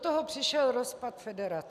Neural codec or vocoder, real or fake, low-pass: none; real; 14.4 kHz